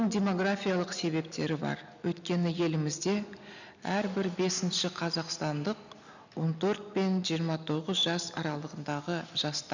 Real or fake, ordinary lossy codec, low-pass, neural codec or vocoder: real; none; 7.2 kHz; none